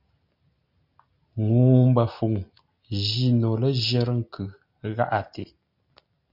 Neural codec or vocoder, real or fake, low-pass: none; real; 5.4 kHz